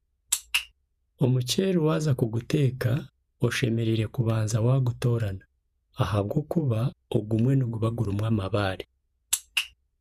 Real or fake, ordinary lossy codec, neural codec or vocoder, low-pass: fake; none; vocoder, 48 kHz, 128 mel bands, Vocos; 14.4 kHz